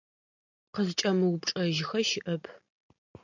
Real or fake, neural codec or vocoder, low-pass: real; none; 7.2 kHz